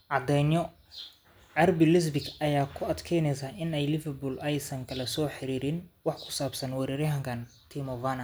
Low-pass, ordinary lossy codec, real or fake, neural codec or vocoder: none; none; real; none